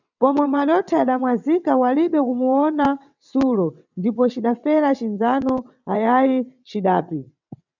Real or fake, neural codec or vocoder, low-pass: fake; vocoder, 22.05 kHz, 80 mel bands, WaveNeXt; 7.2 kHz